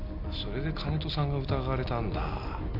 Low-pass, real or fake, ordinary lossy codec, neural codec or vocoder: 5.4 kHz; real; none; none